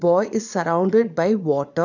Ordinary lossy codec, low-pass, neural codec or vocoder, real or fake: none; 7.2 kHz; vocoder, 22.05 kHz, 80 mel bands, Vocos; fake